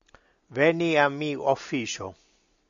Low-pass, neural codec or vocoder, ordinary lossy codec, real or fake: 7.2 kHz; none; AAC, 64 kbps; real